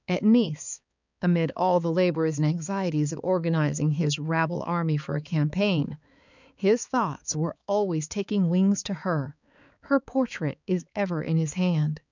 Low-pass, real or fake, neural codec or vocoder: 7.2 kHz; fake; codec, 16 kHz, 4 kbps, X-Codec, HuBERT features, trained on balanced general audio